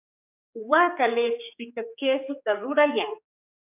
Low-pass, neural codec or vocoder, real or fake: 3.6 kHz; codec, 16 kHz, 4 kbps, X-Codec, HuBERT features, trained on general audio; fake